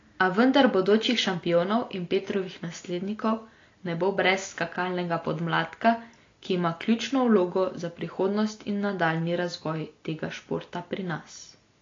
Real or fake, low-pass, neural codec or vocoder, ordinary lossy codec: real; 7.2 kHz; none; AAC, 32 kbps